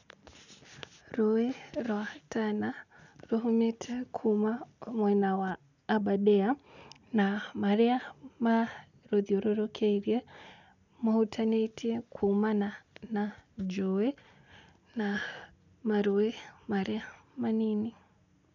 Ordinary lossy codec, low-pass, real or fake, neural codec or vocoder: none; 7.2 kHz; real; none